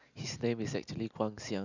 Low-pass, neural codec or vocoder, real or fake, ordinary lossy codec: 7.2 kHz; none; real; none